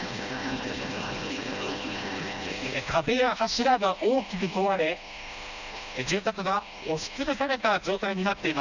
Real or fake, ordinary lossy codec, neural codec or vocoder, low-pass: fake; none; codec, 16 kHz, 1 kbps, FreqCodec, smaller model; 7.2 kHz